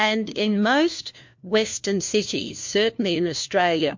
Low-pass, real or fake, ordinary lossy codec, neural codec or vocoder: 7.2 kHz; fake; MP3, 48 kbps; codec, 16 kHz, 1 kbps, FunCodec, trained on LibriTTS, 50 frames a second